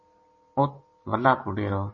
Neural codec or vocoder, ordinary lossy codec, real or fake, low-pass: none; MP3, 32 kbps; real; 7.2 kHz